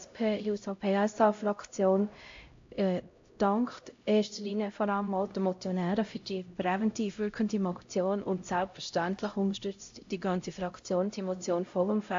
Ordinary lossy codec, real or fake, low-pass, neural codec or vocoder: AAC, 48 kbps; fake; 7.2 kHz; codec, 16 kHz, 0.5 kbps, X-Codec, HuBERT features, trained on LibriSpeech